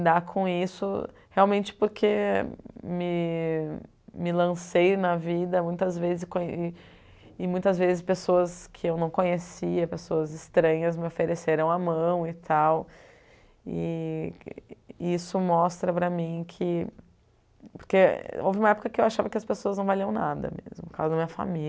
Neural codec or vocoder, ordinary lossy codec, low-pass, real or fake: none; none; none; real